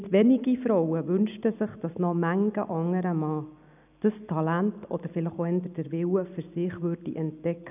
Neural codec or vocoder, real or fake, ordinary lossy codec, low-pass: none; real; none; 3.6 kHz